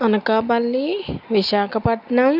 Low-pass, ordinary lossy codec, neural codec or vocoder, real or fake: 5.4 kHz; none; none; real